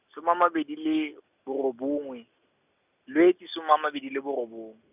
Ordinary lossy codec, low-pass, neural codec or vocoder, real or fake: none; 3.6 kHz; none; real